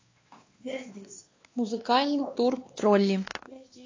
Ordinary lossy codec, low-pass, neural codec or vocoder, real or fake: AAC, 32 kbps; 7.2 kHz; codec, 16 kHz, 2 kbps, X-Codec, WavLM features, trained on Multilingual LibriSpeech; fake